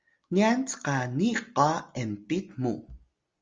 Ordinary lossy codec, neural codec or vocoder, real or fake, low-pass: Opus, 32 kbps; none; real; 7.2 kHz